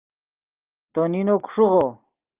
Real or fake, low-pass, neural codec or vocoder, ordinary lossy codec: real; 3.6 kHz; none; Opus, 24 kbps